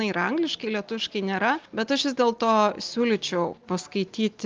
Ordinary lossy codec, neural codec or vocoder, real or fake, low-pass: Opus, 24 kbps; none; real; 7.2 kHz